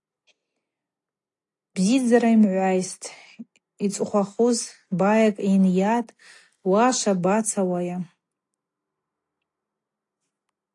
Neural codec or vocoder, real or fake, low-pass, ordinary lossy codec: none; real; 10.8 kHz; AAC, 48 kbps